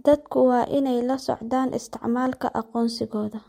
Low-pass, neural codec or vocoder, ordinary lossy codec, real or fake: 19.8 kHz; none; MP3, 64 kbps; real